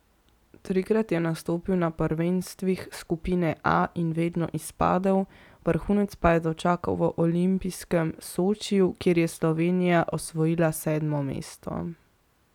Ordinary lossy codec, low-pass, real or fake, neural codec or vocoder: none; 19.8 kHz; real; none